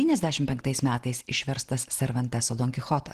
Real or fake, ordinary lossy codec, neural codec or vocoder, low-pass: real; Opus, 16 kbps; none; 14.4 kHz